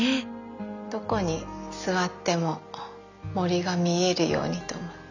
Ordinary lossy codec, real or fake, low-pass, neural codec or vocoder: none; real; 7.2 kHz; none